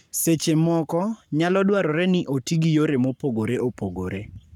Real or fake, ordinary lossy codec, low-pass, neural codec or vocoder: fake; none; 19.8 kHz; codec, 44.1 kHz, 7.8 kbps, Pupu-Codec